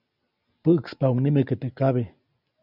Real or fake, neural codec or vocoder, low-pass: real; none; 5.4 kHz